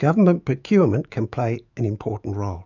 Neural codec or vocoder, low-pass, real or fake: autoencoder, 48 kHz, 128 numbers a frame, DAC-VAE, trained on Japanese speech; 7.2 kHz; fake